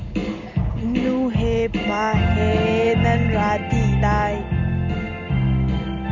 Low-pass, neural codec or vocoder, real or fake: 7.2 kHz; none; real